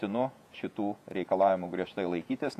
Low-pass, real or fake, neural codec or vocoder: 14.4 kHz; fake; vocoder, 48 kHz, 128 mel bands, Vocos